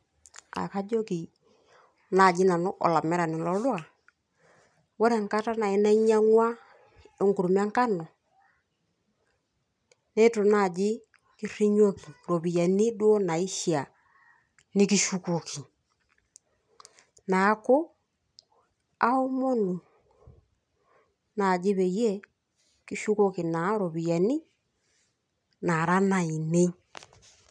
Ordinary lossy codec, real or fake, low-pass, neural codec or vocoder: none; real; 9.9 kHz; none